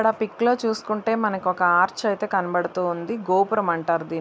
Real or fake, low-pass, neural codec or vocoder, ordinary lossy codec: real; none; none; none